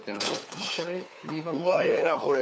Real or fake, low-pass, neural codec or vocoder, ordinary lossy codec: fake; none; codec, 16 kHz, 4 kbps, FunCodec, trained on LibriTTS, 50 frames a second; none